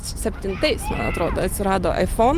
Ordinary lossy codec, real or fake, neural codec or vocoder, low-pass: Opus, 32 kbps; real; none; 14.4 kHz